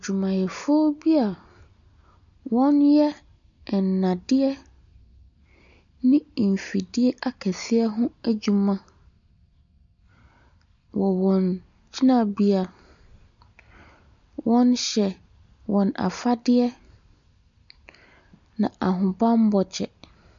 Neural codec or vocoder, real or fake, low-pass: none; real; 7.2 kHz